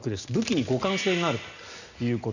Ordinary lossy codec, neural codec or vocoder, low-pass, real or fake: none; none; 7.2 kHz; real